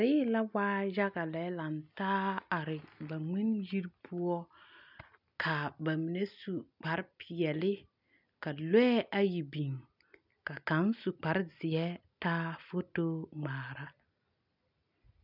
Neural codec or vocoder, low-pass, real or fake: none; 5.4 kHz; real